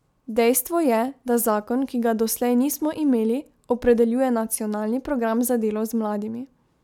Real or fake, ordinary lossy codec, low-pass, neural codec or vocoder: real; none; 19.8 kHz; none